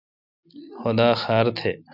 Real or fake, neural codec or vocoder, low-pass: real; none; 5.4 kHz